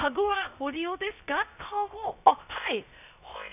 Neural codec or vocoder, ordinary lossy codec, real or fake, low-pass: codec, 16 kHz, 0.3 kbps, FocalCodec; none; fake; 3.6 kHz